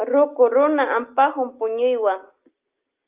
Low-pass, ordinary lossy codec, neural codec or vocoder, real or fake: 3.6 kHz; Opus, 32 kbps; none; real